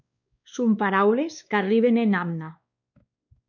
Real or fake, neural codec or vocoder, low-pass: fake; codec, 16 kHz, 2 kbps, X-Codec, WavLM features, trained on Multilingual LibriSpeech; 7.2 kHz